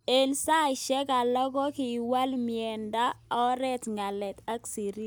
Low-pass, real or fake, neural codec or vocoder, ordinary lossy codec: none; real; none; none